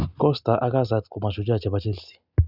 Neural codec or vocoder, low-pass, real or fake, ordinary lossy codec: none; 5.4 kHz; real; none